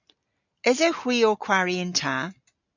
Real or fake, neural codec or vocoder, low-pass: real; none; 7.2 kHz